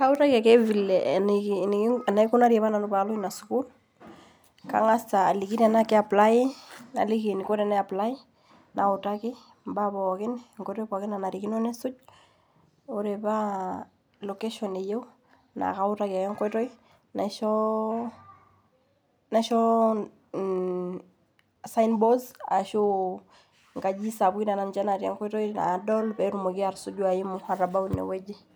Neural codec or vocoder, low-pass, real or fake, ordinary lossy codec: none; none; real; none